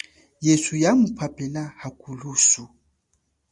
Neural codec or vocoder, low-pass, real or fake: none; 10.8 kHz; real